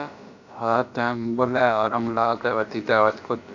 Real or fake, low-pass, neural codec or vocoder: fake; 7.2 kHz; codec, 16 kHz, about 1 kbps, DyCAST, with the encoder's durations